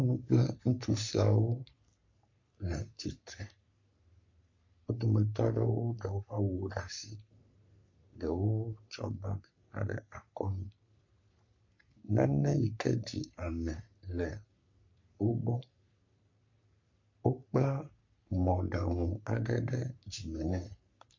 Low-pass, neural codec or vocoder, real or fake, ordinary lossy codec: 7.2 kHz; codec, 44.1 kHz, 3.4 kbps, Pupu-Codec; fake; MP3, 48 kbps